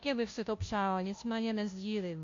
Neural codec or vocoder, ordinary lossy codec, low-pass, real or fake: codec, 16 kHz, 0.5 kbps, FunCodec, trained on Chinese and English, 25 frames a second; AAC, 48 kbps; 7.2 kHz; fake